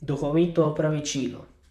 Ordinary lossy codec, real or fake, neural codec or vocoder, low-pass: none; fake; vocoder, 44.1 kHz, 128 mel bands, Pupu-Vocoder; 14.4 kHz